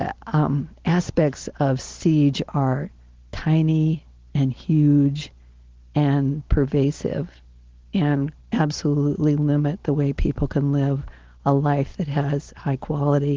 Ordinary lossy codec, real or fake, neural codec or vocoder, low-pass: Opus, 16 kbps; real; none; 7.2 kHz